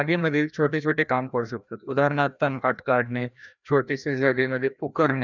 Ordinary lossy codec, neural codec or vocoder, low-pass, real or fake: none; codec, 16 kHz, 1 kbps, FreqCodec, larger model; 7.2 kHz; fake